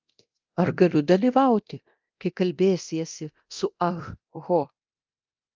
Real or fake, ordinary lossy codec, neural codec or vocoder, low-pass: fake; Opus, 24 kbps; codec, 24 kHz, 0.9 kbps, DualCodec; 7.2 kHz